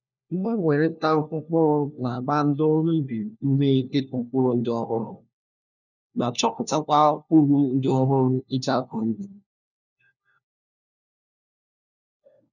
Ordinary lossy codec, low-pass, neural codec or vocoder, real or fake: none; 7.2 kHz; codec, 16 kHz, 1 kbps, FunCodec, trained on LibriTTS, 50 frames a second; fake